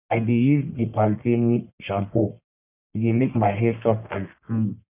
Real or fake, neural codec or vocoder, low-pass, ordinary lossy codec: fake; codec, 44.1 kHz, 1.7 kbps, Pupu-Codec; 3.6 kHz; none